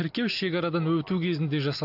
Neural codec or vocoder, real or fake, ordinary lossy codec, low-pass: none; real; AAC, 48 kbps; 5.4 kHz